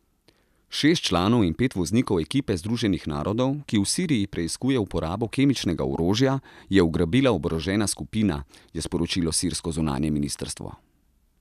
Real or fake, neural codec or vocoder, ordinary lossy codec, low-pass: real; none; none; 14.4 kHz